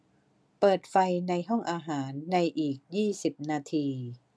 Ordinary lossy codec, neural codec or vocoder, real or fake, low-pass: none; none; real; none